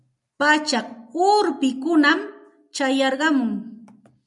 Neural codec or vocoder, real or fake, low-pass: none; real; 10.8 kHz